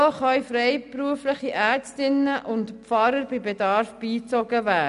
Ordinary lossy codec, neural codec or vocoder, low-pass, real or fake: none; none; 10.8 kHz; real